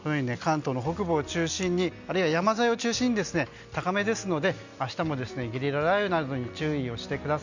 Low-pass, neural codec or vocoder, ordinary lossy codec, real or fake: 7.2 kHz; none; none; real